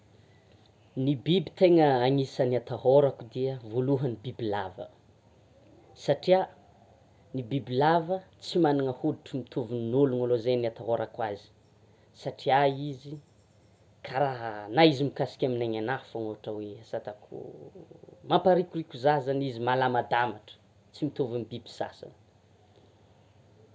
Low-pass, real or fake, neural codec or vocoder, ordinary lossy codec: none; real; none; none